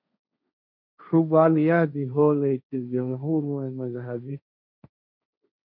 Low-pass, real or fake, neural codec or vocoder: 5.4 kHz; fake; codec, 16 kHz, 1.1 kbps, Voila-Tokenizer